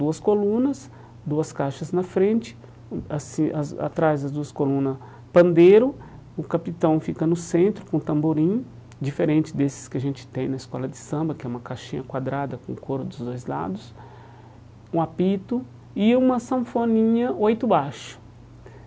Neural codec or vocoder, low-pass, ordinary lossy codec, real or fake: none; none; none; real